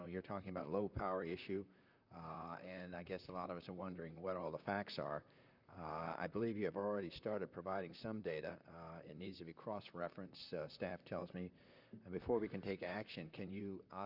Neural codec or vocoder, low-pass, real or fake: vocoder, 44.1 kHz, 128 mel bands, Pupu-Vocoder; 5.4 kHz; fake